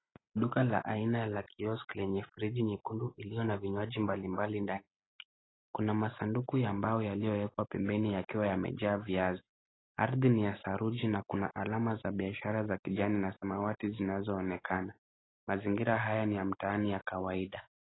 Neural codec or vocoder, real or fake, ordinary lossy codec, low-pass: none; real; AAC, 16 kbps; 7.2 kHz